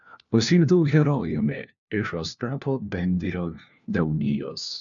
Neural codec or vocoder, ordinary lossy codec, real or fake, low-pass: codec, 16 kHz, 1 kbps, FunCodec, trained on LibriTTS, 50 frames a second; MP3, 96 kbps; fake; 7.2 kHz